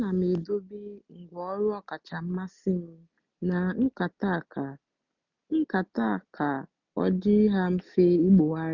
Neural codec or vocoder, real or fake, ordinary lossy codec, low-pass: none; real; none; 7.2 kHz